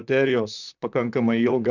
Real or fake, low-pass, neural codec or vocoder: fake; 7.2 kHz; vocoder, 44.1 kHz, 128 mel bands, Pupu-Vocoder